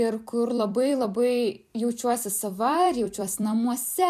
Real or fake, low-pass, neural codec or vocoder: fake; 14.4 kHz; vocoder, 44.1 kHz, 128 mel bands every 256 samples, BigVGAN v2